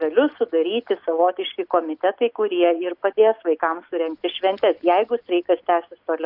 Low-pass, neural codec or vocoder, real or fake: 5.4 kHz; none; real